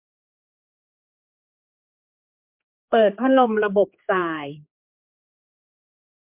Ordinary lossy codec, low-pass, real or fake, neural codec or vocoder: MP3, 32 kbps; 3.6 kHz; fake; codec, 16 kHz, 4 kbps, X-Codec, HuBERT features, trained on general audio